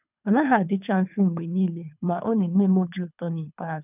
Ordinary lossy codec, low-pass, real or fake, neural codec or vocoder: none; 3.6 kHz; fake; codec, 24 kHz, 3 kbps, HILCodec